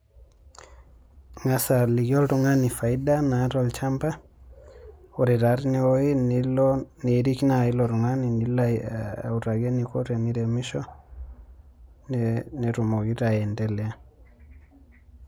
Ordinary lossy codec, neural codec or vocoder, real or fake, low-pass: none; none; real; none